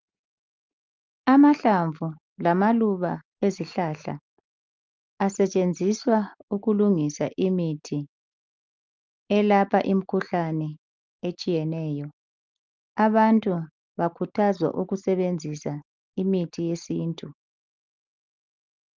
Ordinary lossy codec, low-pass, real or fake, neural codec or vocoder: Opus, 24 kbps; 7.2 kHz; real; none